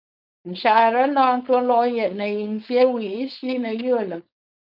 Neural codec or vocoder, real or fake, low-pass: codec, 16 kHz, 4.8 kbps, FACodec; fake; 5.4 kHz